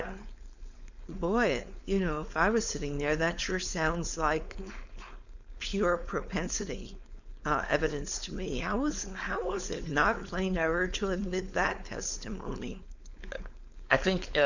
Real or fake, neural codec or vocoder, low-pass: fake; codec, 16 kHz, 4.8 kbps, FACodec; 7.2 kHz